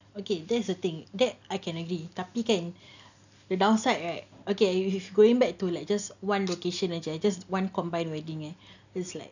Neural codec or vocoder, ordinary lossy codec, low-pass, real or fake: none; none; 7.2 kHz; real